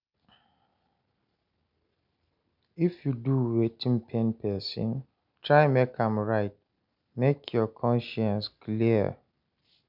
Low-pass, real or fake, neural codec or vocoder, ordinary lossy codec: 5.4 kHz; real; none; none